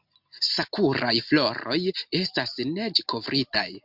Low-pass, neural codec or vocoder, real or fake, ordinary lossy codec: 5.4 kHz; none; real; MP3, 48 kbps